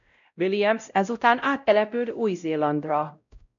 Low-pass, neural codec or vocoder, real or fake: 7.2 kHz; codec, 16 kHz, 0.5 kbps, X-Codec, WavLM features, trained on Multilingual LibriSpeech; fake